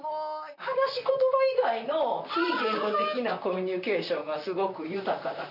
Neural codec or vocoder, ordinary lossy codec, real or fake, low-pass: vocoder, 44.1 kHz, 128 mel bands, Pupu-Vocoder; none; fake; 5.4 kHz